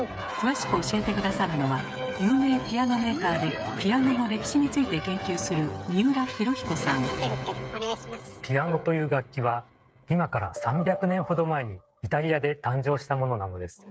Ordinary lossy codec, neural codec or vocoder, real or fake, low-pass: none; codec, 16 kHz, 8 kbps, FreqCodec, smaller model; fake; none